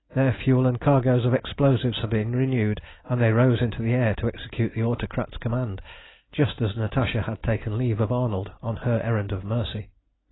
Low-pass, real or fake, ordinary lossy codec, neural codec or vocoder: 7.2 kHz; real; AAC, 16 kbps; none